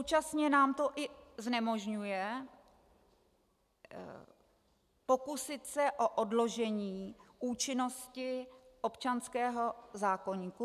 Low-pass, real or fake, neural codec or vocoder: 14.4 kHz; real; none